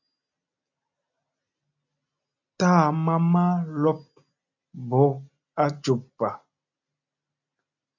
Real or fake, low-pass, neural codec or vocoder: real; 7.2 kHz; none